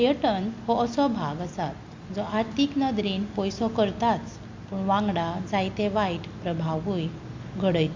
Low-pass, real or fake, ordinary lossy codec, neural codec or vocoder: 7.2 kHz; real; MP3, 48 kbps; none